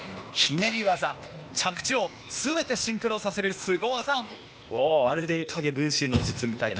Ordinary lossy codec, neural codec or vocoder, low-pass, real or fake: none; codec, 16 kHz, 0.8 kbps, ZipCodec; none; fake